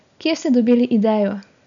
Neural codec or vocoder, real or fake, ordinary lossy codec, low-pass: none; real; none; 7.2 kHz